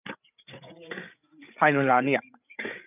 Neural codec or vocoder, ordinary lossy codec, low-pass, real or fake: codec, 16 kHz, 8 kbps, FreqCodec, larger model; none; 3.6 kHz; fake